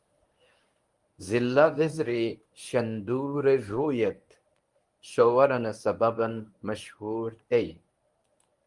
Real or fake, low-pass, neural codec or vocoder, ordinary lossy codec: fake; 10.8 kHz; codec, 24 kHz, 0.9 kbps, WavTokenizer, medium speech release version 1; Opus, 32 kbps